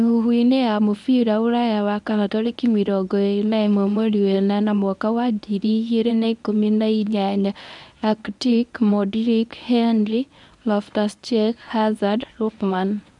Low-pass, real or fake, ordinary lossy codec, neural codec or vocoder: 10.8 kHz; fake; none; codec, 24 kHz, 0.9 kbps, WavTokenizer, medium speech release version 1